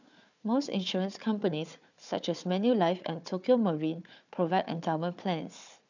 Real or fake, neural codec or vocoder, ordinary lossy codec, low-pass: fake; codec, 16 kHz, 4 kbps, FunCodec, trained on Chinese and English, 50 frames a second; none; 7.2 kHz